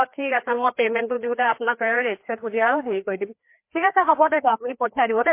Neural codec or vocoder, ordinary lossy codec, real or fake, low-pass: codec, 16 kHz, 2 kbps, FreqCodec, larger model; MP3, 24 kbps; fake; 3.6 kHz